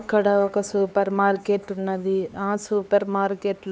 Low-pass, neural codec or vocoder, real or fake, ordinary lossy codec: none; codec, 16 kHz, 4 kbps, X-Codec, HuBERT features, trained on LibriSpeech; fake; none